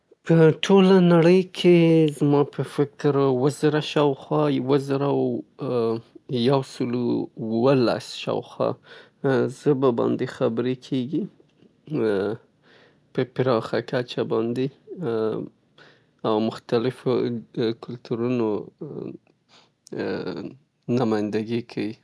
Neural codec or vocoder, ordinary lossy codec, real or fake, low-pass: none; none; real; none